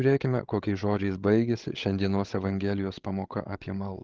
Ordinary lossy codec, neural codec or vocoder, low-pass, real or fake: Opus, 16 kbps; codec, 16 kHz, 16 kbps, FreqCodec, larger model; 7.2 kHz; fake